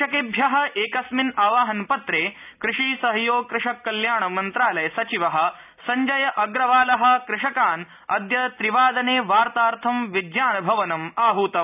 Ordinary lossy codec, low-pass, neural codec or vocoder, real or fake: none; 3.6 kHz; none; real